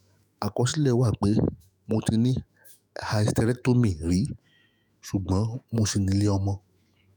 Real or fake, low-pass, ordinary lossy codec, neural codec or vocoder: fake; none; none; autoencoder, 48 kHz, 128 numbers a frame, DAC-VAE, trained on Japanese speech